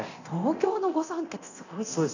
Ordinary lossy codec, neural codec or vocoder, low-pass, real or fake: none; codec, 24 kHz, 0.9 kbps, DualCodec; 7.2 kHz; fake